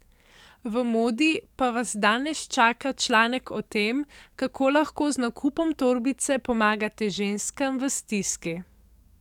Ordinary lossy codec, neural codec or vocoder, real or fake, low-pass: none; codec, 44.1 kHz, 7.8 kbps, DAC; fake; 19.8 kHz